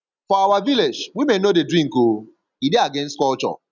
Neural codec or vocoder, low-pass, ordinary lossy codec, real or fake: none; 7.2 kHz; none; real